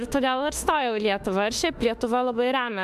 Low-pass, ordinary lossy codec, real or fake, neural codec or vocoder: 14.4 kHz; AAC, 96 kbps; fake; autoencoder, 48 kHz, 32 numbers a frame, DAC-VAE, trained on Japanese speech